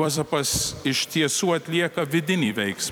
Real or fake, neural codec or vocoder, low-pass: fake; vocoder, 44.1 kHz, 128 mel bands every 256 samples, BigVGAN v2; 19.8 kHz